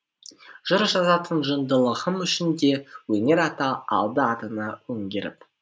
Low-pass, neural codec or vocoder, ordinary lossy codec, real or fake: none; none; none; real